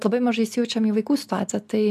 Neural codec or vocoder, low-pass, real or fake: none; 14.4 kHz; real